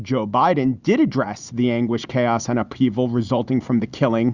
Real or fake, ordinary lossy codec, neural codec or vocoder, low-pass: real; Opus, 64 kbps; none; 7.2 kHz